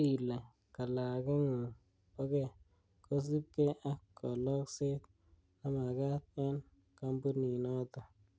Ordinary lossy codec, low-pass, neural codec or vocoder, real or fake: none; none; none; real